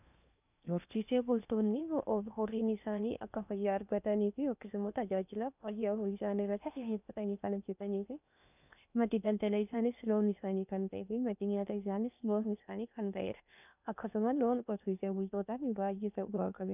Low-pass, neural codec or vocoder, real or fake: 3.6 kHz; codec, 16 kHz in and 24 kHz out, 0.8 kbps, FocalCodec, streaming, 65536 codes; fake